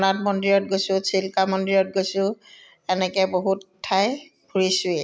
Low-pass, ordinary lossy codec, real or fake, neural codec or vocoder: none; none; real; none